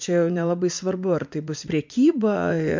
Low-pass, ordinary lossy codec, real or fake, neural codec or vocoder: 7.2 kHz; MP3, 64 kbps; fake; vocoder, 44.1 kHz, 80 mel bands, Vocos